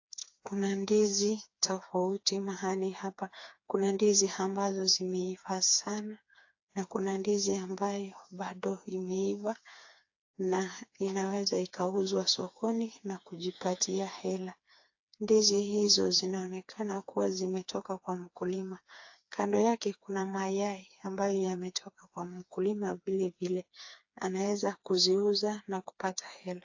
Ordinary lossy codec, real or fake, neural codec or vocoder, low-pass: AAC, 48 kbps; fake; codec, 16 kHz, 4 kbps, FreqCodec, smaller model; 7.2 kHz